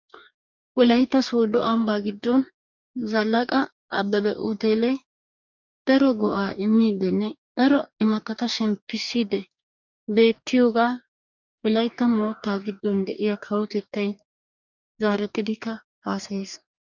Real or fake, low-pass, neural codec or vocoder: fake; 7.2 kHz; codec, 44.1 kHz, 2.6 kbps, DAC